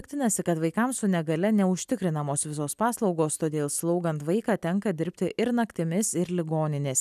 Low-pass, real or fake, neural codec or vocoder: 14.4 kHz; real; none